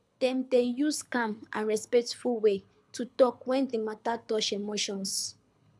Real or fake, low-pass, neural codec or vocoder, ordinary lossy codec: fake; none; codec, 24 kHz, 6 kbps, HILCodec; none